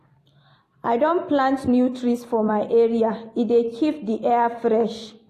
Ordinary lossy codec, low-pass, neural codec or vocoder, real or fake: AAC, 48 kbps; 14.4 kHz; vocoder, 44.1 kHz, 128 mel bands every 256 samples, BigVGAN v2; fake